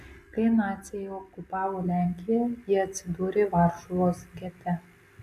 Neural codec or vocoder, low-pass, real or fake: none; 14.4 kHz; real